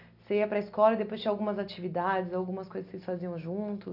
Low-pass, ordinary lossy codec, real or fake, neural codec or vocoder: 5.4 kHz; none; real; none